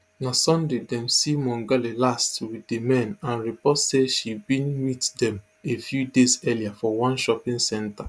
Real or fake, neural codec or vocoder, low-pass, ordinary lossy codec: real; none; none; none